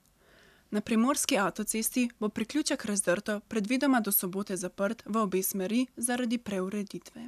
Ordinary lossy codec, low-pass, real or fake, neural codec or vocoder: none; 14.4 kHz; real; none